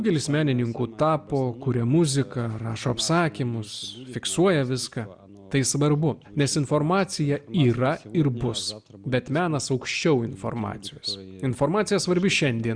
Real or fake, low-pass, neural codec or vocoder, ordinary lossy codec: real; 9.9 kHz; none; Opus, 64 kbps